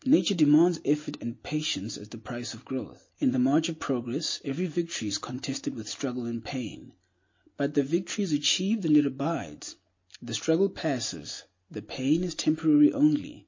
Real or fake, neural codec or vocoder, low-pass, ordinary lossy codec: real; none; 7.2 kHz; MP3, 32 kbps